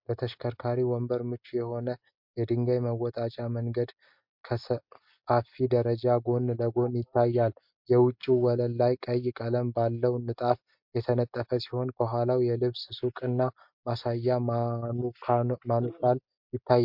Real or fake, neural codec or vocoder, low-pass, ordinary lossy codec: real; none; 5.4 kHz; MP3, 48 kbps